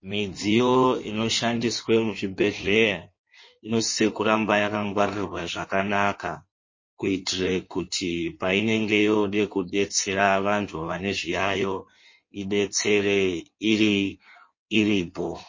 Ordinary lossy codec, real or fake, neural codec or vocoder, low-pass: MP3, 32 kbps; fake; codec, 16 kHz in and 24 kHz out, 1.1 kbps, FireRedTTS-2 codec; 7.2 kHz